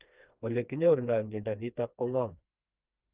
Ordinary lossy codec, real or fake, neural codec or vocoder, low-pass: Opus, 24 kbps; fake; codec, 16 kHz, 2 kbps, FreqCodec, smaller model; 3.6 kHz